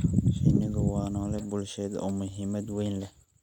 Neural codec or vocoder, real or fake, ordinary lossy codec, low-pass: none; real; none; 19.8 kHz